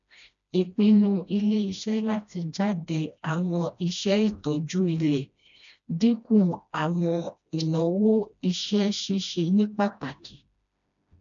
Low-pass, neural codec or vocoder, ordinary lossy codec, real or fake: 7.2 kHz; codec, 16 kHz, 1 kbps, FreqCodec, smaller model; none; fake